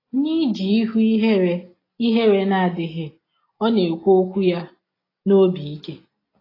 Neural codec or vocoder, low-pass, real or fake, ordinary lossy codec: none; 5.4 kHz; real; AAC, 24 kbps